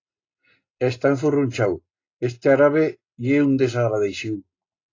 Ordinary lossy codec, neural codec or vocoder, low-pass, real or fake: AAC, 32 kbps; none; 7.2 kHz; real